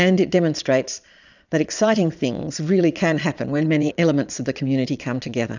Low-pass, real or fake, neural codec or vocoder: 7.2 kHz; fake; vocoder, 22.05 kHz, 80 mel bands, Vocos